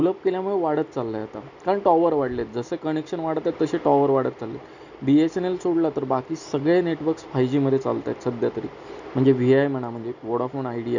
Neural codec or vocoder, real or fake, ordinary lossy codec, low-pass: none; real; none; 7.2 kHz